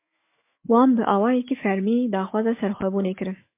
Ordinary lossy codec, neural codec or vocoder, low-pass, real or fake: MP3, 24 kbps; autoencoder, 48 kHz, 128 numbers a frame, DAC-VAE, trained on Japanese speech; 3.6 kHz; fake